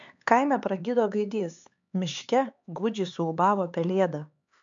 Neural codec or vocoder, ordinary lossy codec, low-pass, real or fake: codec, 16 kHz, 4 kbps, X-Codec, HuBERT features, trained on LibriSpeech; MP3, 64 kbps; 7.2 kHz; fake